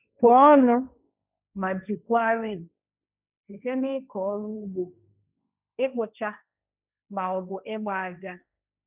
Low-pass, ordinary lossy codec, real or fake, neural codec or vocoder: 3.6 kHz; none; fake; codec, 16 kHz, 1.1 kbps, Voila-Tokenizer